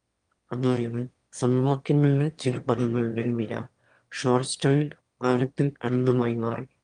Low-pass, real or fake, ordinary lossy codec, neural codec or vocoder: 9.9 kHz; fake; Opus, 32 kbps; autoencoder, 22.05 kHz, a latent of 192 numbers a frame, VITS, trained on one speaker